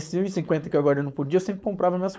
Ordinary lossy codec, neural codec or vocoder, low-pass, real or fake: none; codec, 16 kHz, 4.8 kbps, FACodec; none; fake